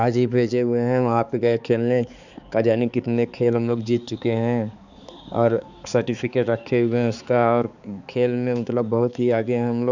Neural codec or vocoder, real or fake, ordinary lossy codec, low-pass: codec, 16 kHz, 4 kbps, X-Codec, HuBERT features, trained on balanced general audio; fake; none; 7.2 kHz